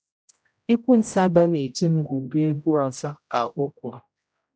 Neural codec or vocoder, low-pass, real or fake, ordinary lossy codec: codec, 16 kHz, 0.5 kbps, X-Codec, HuBERT features, trained on general audio; none; fake; none